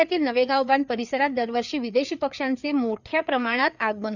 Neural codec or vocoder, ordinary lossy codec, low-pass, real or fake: codec, 16 kHz, 4 kbps, FreqCodec, larger model; none; 7.2 kHz; fake